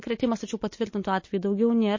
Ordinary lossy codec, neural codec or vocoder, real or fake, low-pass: MP3, 32 kbps; none; real; 7.2 kHz